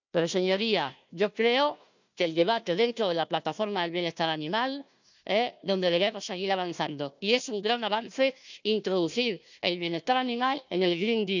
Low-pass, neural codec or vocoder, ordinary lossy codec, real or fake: 7.2 kHz; codec, 16 kHz, 1 kbps, FunCodec, trained on Chinese and English, 50 frames a second; none; fake